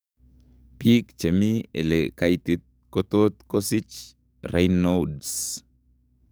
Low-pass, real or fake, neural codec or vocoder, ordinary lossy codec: none; fake; codec, 44.1 kHz, 7.8 kbps, DAC; none